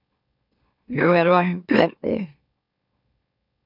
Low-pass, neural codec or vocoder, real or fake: 5.4 kHz; autoencoder, 44.1 kHz, a latent of 192 numbers a frame, MeloTTS; fake